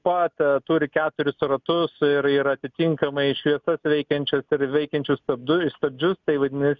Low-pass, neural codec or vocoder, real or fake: 7.2 kHz; none; real